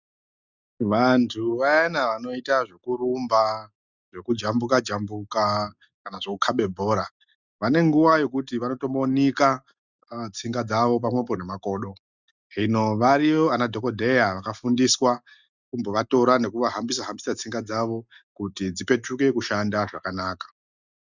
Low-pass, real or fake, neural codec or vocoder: 7.2 kHz; real; none